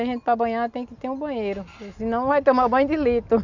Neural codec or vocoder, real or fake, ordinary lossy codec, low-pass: none; real; none; 7.2 kHz